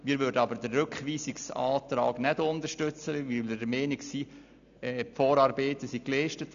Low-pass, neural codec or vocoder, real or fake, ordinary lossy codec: 7.2 kHz; none; real; MP3, 96 kbps